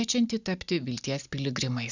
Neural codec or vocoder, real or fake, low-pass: none; real; 7.2 kHz